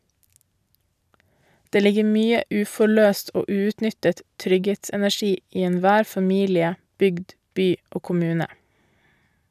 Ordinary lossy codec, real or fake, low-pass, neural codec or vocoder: none; real; 14.4 kHz; none